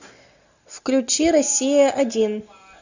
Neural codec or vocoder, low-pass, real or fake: none; 7.2 kHz; real